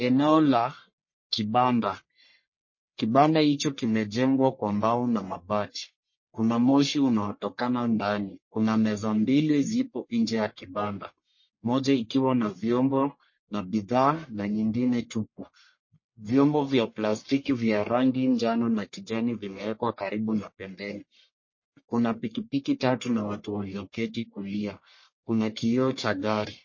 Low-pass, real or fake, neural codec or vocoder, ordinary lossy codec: 7.2 kHz; fake; codec, 44.1 kHz, 1.7 kbps, Pupu-Codec; MP3, 32 kbps